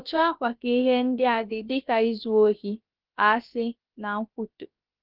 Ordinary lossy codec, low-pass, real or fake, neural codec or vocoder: Opus, 24 kbps; 5.4 kHz; fake; codec, 16 kHz, about 1 kbps, DyCAST, with the encoder's durations